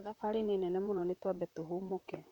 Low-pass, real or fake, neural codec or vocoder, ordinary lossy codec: 19.8 kHz; fake; vocoder, 44.1 kHz, 128 mel bands, Pupu-Vocoder; none